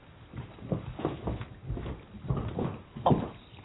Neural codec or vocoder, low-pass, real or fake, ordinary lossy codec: none; 7.2 kHz; real; AAC, 16 kbps